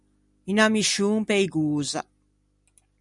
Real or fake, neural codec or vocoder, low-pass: real; none; 10.8 kHz